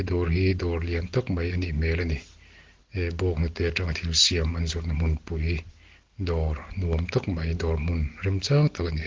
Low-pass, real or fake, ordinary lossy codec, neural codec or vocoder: 7.2 kHz; real; Opus, 16 kbps; none